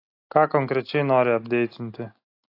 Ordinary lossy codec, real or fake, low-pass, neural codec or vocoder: AAC, 32 kbps; real; 5.4 kHz; none